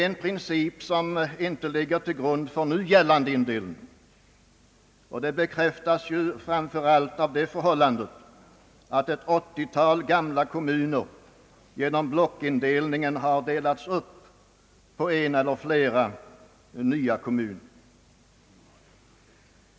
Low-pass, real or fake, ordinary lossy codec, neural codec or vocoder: none; real; none; none